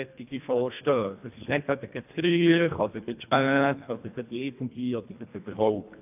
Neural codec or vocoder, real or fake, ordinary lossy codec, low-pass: codec, 24 kHz, 1.5 kbps, HILCodec; fake; AAC, 32 kbps; 3.6 kHz